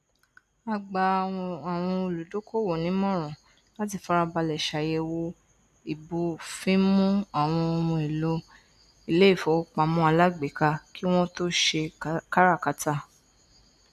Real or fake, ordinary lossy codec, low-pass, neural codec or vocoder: real; none; 14.4 kHz; none